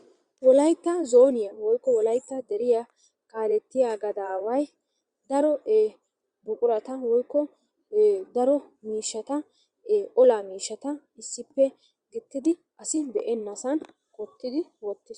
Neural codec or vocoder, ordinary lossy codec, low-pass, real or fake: vocoder, 22.05 kHz, 80 mel bands, Vocos; Opus, 64 kbps; 9.9 kHz; fake